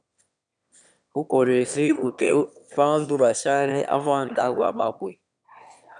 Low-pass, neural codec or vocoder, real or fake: 9.9 kHz; autoencoder, 22.05 kHz, a latent of 192 numbers a frame, VITS, trained on one speaker; fake